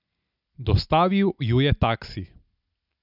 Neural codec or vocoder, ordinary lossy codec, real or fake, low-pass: none; none; real; 5.4 kHz